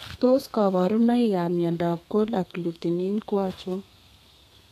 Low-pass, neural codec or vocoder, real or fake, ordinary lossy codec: 14.4 kHz; codec, 32 kHz, 1.9 kbps, SNAC; fake; none